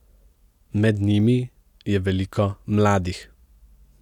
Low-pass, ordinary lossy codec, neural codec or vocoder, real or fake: 19.8 kHz; none; none; real